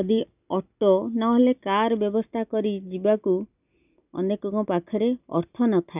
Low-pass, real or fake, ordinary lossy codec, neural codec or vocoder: 3.6 kHz; real; none; none